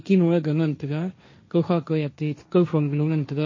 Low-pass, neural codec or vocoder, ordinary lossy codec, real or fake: 7.2 kHz; codec, 16 kHz, 1.1 kbps, Voila-Tokenizer; MP3, 32 kbps; fake